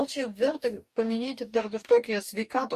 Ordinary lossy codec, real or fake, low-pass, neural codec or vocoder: Opus, 64 kbps; fake; 14.4 kHz; codec, 44.1 kHz, 2.6 kbps, DAC